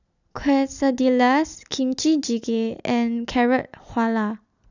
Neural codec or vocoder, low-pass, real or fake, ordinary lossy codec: none; 7.2 kHz; real; none